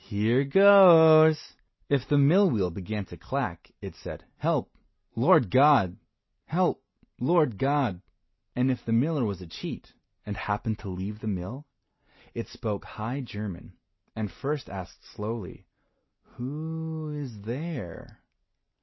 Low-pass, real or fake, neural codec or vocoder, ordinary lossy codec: 7.2 kHz; real; none; MP3, 24 kbps